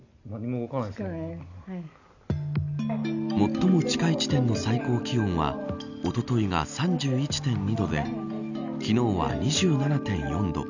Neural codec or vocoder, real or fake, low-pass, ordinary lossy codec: none; real; 7.2 kHz; none